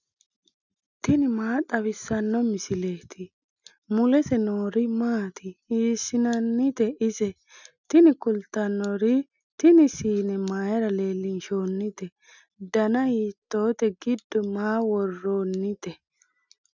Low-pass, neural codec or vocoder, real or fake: 7.2 kHz; none; real